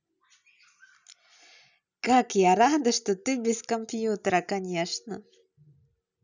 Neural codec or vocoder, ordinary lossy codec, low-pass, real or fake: none; none; 7.2 kHz; real